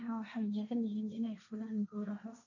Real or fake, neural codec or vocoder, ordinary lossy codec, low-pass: fake; codec, 16 kHz, 1.1 kbps, Voila-Tokenizer; none; 7.2 kHz